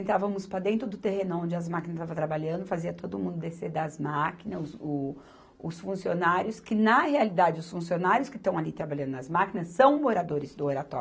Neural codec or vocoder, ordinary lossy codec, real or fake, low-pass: none; none; real; none